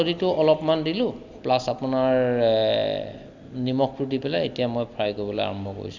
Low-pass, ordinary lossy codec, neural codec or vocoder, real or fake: 7.2 kHz; Opus, 64 kbps; none; real